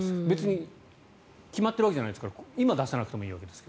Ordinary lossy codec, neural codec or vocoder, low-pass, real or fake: none; none; none; real